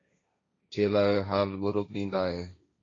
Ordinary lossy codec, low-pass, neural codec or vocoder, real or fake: AAC, 32 kbps; 7.2 kHz; codec, 16 kHz, 1.1 kbps, Voila-Tokenizer; fake